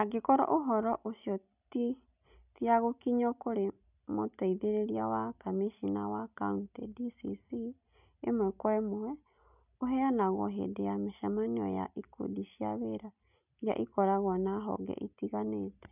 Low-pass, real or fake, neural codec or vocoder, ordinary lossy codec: 3.6 kHz; real; none; none